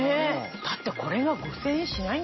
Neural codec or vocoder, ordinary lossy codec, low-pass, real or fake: none; MP3, 24 kbps; 7.2 kHz; real